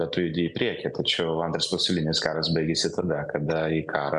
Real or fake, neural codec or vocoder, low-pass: real; none; 10.8 kHz